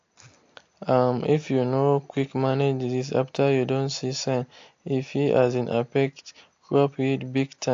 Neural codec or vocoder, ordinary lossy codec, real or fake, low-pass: none; AAC, 48 kbps; real; 7.2 kHz